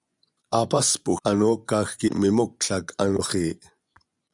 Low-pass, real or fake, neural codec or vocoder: 10.8 kHz; fake; vocoder, 24 kHz, 100 mel bands, Vocos